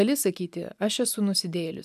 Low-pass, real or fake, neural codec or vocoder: 14.4 kHz; real; none